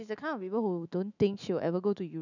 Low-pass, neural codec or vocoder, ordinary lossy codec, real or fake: 7.2 kHz; autoencoder, 48 kHz, 128 numbers a frame, DAC-VAE, trained on Japanese speech; none; fake